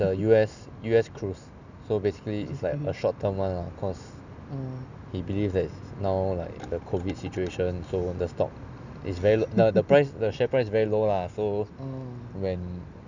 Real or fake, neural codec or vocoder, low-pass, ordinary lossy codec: real; none; 7.2 kHz; none